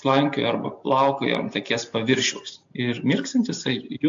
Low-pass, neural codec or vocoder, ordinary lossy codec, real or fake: 7.2 kHz; none; AAC, 64 kbps; real